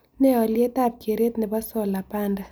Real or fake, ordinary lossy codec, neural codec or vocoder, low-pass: real; none; none; none